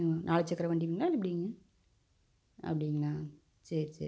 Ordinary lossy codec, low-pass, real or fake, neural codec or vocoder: none; none; real; none